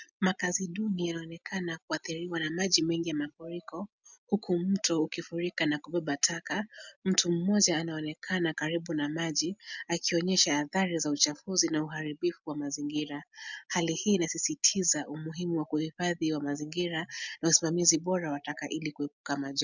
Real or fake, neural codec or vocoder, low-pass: real; none; 7.2 kHz